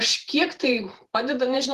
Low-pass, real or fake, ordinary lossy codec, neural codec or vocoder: 14.4 kHz; fake; Opus, 16 kbps; vocoder, 48 kHz, 128 mel bands, Vocos